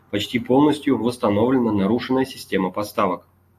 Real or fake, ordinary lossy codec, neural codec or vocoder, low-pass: real; AAC, 64 kbps; none; 14.4 kHz